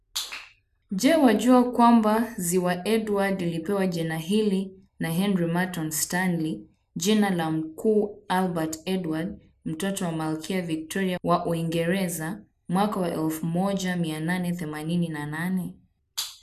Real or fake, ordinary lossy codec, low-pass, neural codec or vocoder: real; none; 14.4 kHz; none